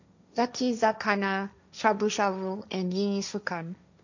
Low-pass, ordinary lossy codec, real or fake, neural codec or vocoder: 7.2 kHz; none; fake; codec, 16 kHz, 1.1 kbps, Voila-Tokenizer